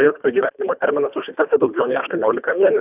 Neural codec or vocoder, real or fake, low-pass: codec, 24 kHz, 1.5 kbps, HILCodec; fake; 3.6 kHz